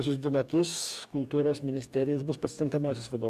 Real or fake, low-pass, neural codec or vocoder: fake; 14.4 kHz; codec, 44.1 kHz, 2.6 kbps, DAC